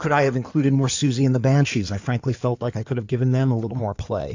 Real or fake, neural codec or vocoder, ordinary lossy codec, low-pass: fake; codec, 16 kHz in and 24 kHz out, 2.2 kbps, FireRedTTS-2 codec; AAC, 48 kbps; 7.2 kHz